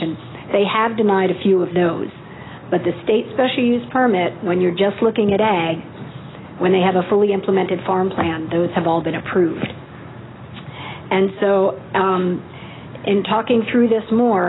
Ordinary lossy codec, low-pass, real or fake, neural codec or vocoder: AAC, 16 kbps; 7.2 kHz; real; none